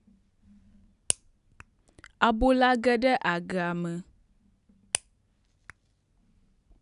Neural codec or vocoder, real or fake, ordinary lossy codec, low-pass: none; real; none; 10.8 kHz